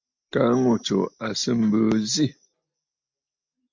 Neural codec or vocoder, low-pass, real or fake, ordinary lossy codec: none; 7.2 kHz; real; MP3, 48 kbps